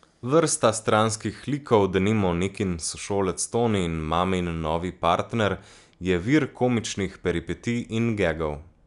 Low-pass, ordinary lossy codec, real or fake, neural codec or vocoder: 10.8 kHz; none; real; none